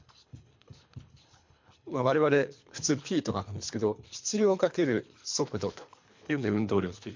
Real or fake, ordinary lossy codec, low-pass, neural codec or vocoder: fake; MP3, 48 kbps; 7.2 kHz; codec, 24 kHz, 3 kbps, HILCodec